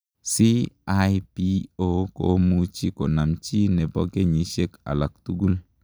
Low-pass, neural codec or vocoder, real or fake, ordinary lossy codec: none; none; real; none